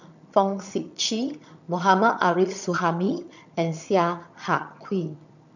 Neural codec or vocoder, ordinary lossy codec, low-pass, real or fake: vocoder, 22.05 kHz, 80 mel bands, HiFi-GAN; none; 7.2 kHz; fake